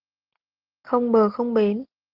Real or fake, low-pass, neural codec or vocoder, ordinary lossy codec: real; 5.4 kHz; none; Opus, 16 kbps